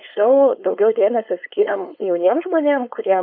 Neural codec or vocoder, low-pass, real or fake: codec, 16 kHz, 4.8 kbps, FACodec; 5.4 kHz; fake